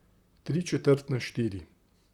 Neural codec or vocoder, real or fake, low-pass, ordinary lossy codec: vocoder, 44.1 kHz, 128 mel bands, Pupu-Vocoder; fake; 19.8 kHz; Opus, 64 kbps